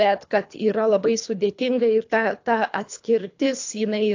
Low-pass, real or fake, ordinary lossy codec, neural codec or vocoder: 7.2 kHz; fake; AAC, 48 kbps; codec, 24 kHz, 3 kbps, HILCodec